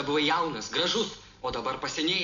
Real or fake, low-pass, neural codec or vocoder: real; 7.2 kHz; none